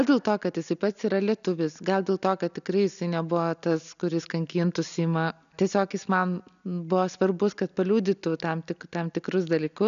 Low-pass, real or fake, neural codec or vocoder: 7.2 kHz; real; none